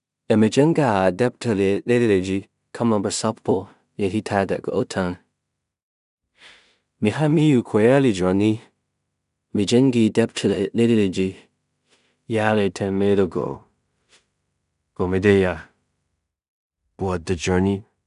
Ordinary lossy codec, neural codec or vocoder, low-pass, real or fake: none; codec, 16 kHz in and 24 kHz out, 0.4 kbps, LongCat-Audio-Codec, two codebook decoder; 10.8 kHz; fake